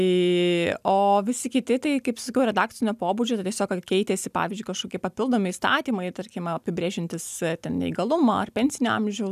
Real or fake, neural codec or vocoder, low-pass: real; none; 14.4 kHz